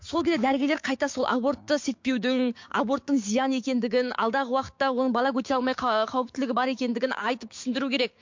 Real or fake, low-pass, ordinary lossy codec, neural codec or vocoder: fake; 7.2 kHz; MP3, 48 kbps; codec, 16 kHz, 6 kbps, DAC